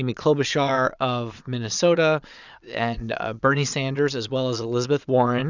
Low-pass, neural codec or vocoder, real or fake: 7.2 kHz; vocoder, 22.05 kHz, 80 mel bands, Vocos; fake